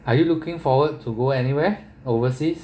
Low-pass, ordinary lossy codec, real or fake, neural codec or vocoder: none; none; real; none